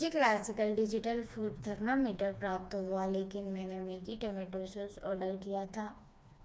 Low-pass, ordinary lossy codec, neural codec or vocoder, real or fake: none; none; codec, 16 kHz, 2 kbps, FreqCodec, smaller model; fake